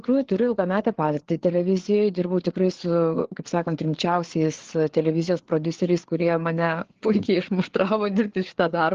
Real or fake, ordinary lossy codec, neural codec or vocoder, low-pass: fake; Opus, 16 kbps; codec, 16 kHz, 4 kbps, FreqCodec, larger model; 7.2 kHz